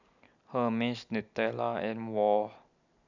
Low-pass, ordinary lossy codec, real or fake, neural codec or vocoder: 7.2 kHz; none; real; none